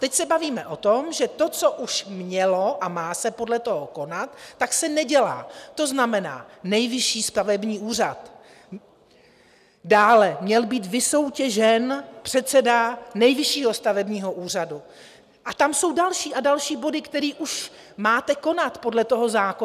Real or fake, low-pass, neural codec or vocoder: real; 14.4 kHz; none